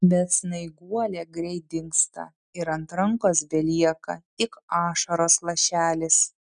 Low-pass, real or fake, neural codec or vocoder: 9.9 kHz; real; none